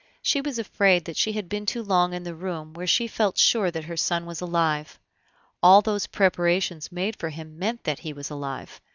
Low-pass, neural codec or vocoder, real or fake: 7.2 kHz; none; real